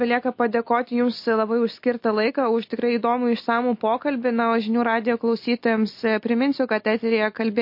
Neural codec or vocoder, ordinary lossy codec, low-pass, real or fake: none; MP3, 32 kbps; 5.4 kHz; real